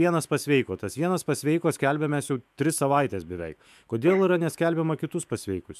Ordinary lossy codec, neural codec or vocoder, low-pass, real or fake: MP3, 96 kbps; autoencoder, 48 kHz, 128 numbers a frame, DAC-VAE, trained on Japanese speech; 14.4 kHz; fake